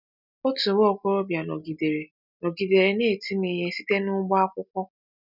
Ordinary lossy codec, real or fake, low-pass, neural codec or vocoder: none; real; 5.4 kHz; none